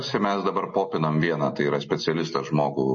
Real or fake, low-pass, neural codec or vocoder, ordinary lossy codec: real; 7.2 kHz; none; MP3, 32 kbps